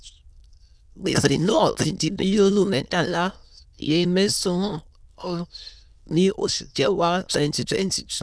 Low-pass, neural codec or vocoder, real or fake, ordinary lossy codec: none; autoencoder, 22.05 kHz, a latent of 192 numbers a frame, VITS, trained on many speakers; fake; none